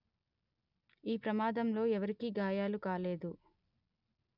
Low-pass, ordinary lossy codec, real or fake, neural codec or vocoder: 5.4 kHz; none; real; none